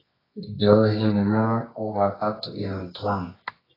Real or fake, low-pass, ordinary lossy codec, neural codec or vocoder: fake; 5.4 kHz; AAC, 24 kbps; codec, 24 kHz, 0.9 kbps, WavTokenizer, medium music audio release